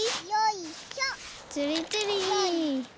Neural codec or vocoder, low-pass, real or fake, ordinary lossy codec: none; none; real; none